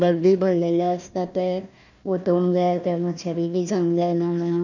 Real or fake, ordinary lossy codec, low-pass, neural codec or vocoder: fake; none; 7.2 kHz; codec, 16 kHz, 1 kbps, FunCodec, trained on Chinese and English, 50 frames a second